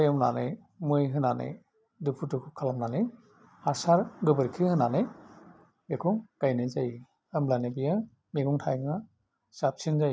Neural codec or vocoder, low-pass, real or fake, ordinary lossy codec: none; none; real; none